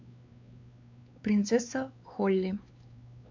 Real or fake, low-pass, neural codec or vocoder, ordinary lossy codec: fake; 7.2 kHz; codec, 16 kHz, 4 kbps, X-Codec, WavLM features, trained on Multilingual LibriSpeech; MP3, 64 kbps